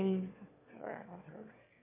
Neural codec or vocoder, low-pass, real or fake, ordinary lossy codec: autoencoder, 22.05 kHz, a latent of 192 numbers a frame, VITS, trained on one speaker; 3.6 kHz; fake; MP3, 32 kbps